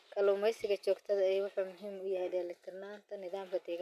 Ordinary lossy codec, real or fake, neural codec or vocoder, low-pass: MP3, 96 kbps; real; none; 14.4 kHz